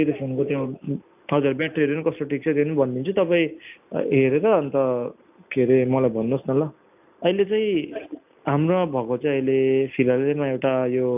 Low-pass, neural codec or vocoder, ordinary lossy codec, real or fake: 3.6 kHz; none; AAC, 32 kbps; real